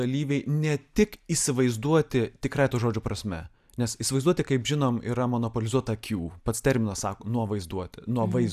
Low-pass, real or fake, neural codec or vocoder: 14.4 kHz; real; none